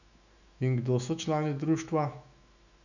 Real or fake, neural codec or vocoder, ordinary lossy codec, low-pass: fake; autoencoder, 48 kHz, 128 numbers a frame, DAC-VAE, trained on Japanese speech; none; 7.2 kHz